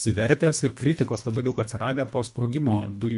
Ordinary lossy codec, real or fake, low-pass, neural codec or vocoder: MP3, 64 kbps; fake; 10.8 kHz; codec, 24 kHz, 1.5 kbps, HILCodec